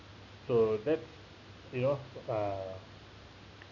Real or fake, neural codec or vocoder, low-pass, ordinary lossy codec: real; none; 7.2 kHz; none